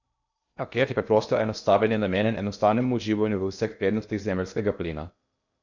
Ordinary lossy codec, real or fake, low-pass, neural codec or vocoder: none; fake; 7.2 kHz; codec, 16 kHz in and 24 kHz out, 0.8 kbps, FocalCodec, streaming, 65536 codes